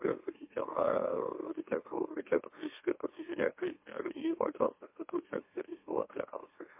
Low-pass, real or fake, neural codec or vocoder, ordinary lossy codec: 3.6 kHz; fake; autoencoder, 44.1 kHz, a latent of 192 numbers a frame, MeloTTS; MP3, 24 kbps